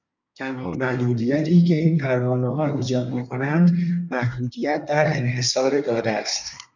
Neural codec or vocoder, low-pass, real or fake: codec, 24 kHz, 1 kbps, SNAC; 7.2 kHz; fake